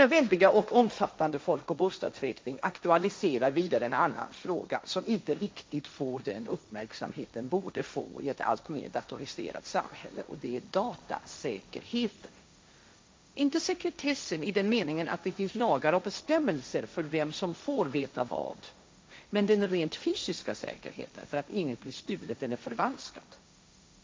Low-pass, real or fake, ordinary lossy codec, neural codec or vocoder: none; fake; none; codec, 16 kHz, 1.1 kbps, Voila-Tokenizer